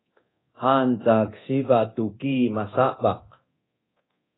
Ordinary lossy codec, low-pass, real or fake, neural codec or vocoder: AAC, 16 kbps; 7.2 kHz; fake; codec, 24 kHz, 0.9 kbps, DualCodec